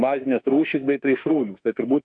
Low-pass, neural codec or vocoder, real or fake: 9.9 kHz; autoencoder, 48 kHz, 32 numbers a frame, DAC-VAE, trained on Japanese speech; fake